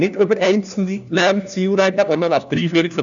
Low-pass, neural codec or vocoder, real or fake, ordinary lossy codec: 7.2 kHz; codec, 16 kHz, 1 kbps, FunCodec, trained on LibriTTS, 50 frames a second; fake; none